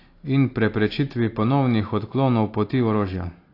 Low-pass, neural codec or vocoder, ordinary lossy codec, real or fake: 5.4 kHz; none; AAC, 32 kbps; real